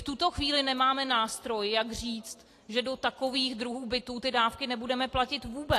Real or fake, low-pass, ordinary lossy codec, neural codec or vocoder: real; 14.4 kHz; AAC, 48 kbps; none